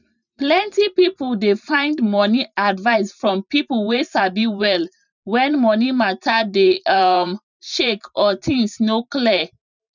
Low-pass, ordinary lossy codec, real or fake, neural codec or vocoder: 7.2 kHz; none; fake; vocoder, 44.1 kHz, 128 mel bands every 512 samples, BigVGAN v2